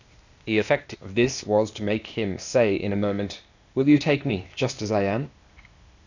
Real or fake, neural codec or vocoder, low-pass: fake; codec, 16 kHz, 0.8 kbps, ZipCodec; 7.2 kHz